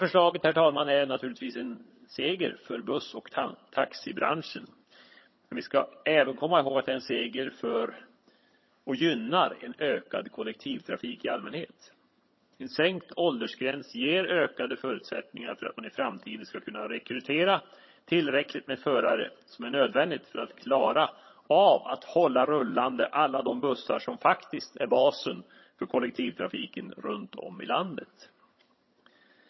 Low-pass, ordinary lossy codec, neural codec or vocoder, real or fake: 7.2 kHz; MP3, 24 kbps; vocoder, 22.05 kHz, 80 mel bands, HiFi-GAN; fake